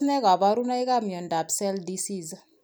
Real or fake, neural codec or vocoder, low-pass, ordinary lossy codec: real; none; none; none